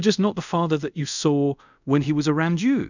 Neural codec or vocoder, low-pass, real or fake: codec, 24 kHz, 0.5 kbps, DualCodec; 7.2 kHz; fake